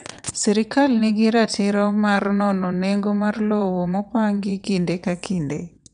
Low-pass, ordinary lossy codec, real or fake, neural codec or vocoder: 9.9 kHz; none; fake; vocoder, 22.05 kHz, 80 mel bands, WaveNeXt